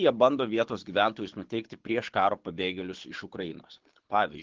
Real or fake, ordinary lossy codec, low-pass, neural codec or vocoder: fake; Opus, 16 kbps; 7.2 kHz; codec, 24 kHz, 6 kbps, HILCodec